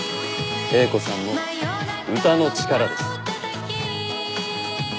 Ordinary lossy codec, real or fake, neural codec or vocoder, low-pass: none; real; none; none